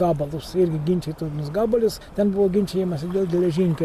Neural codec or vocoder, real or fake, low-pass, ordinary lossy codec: none; real; 14.4 kHz; Opus, 64 kbps